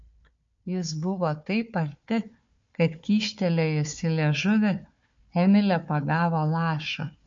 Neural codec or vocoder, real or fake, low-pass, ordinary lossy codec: codec, 16 kHz, 4 kbps, FunCodec, trained on Chinese and English, 50 frames a second; fake; 7.2 kHz; MP3, 48 kbps